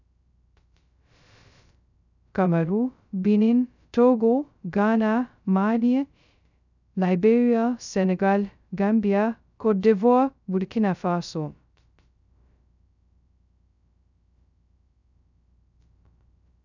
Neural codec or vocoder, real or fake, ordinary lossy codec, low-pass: codec, 16 kHz, 0.2 kbps, FocalCodec; fake; none; 7.2 kHz